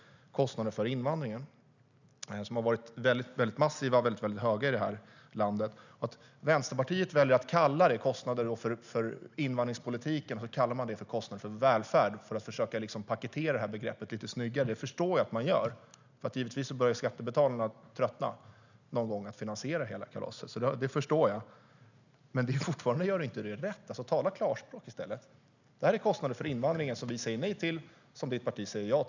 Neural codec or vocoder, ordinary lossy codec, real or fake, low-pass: none; none; real; 7.2 kHz